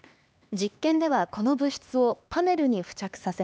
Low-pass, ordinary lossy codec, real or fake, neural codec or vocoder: none; none; fake; codec, 16 kHz, 2 kbps, X-Codec, HuBERT features, trained on LibriSpeech